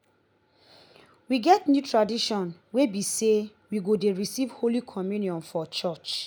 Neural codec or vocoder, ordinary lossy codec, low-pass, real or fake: none; none; none; real